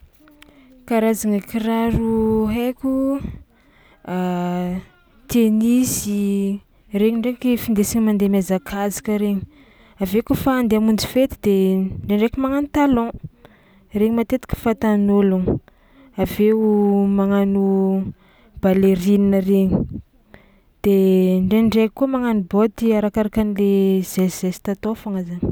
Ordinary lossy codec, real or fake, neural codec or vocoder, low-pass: none; real; none; none